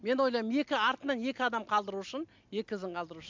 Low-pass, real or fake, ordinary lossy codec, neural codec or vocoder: 7.2 kHz; real; MP3, 64 kbps; none